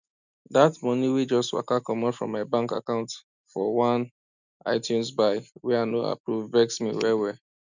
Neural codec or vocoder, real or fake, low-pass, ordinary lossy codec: none; real; 7.2 kHz; none